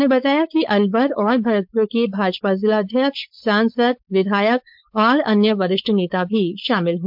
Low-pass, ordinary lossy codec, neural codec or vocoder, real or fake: 5.4 kHz; MP3, 48 kbps; codec, 16 kHz, 4.8 kbps, FACodec; fake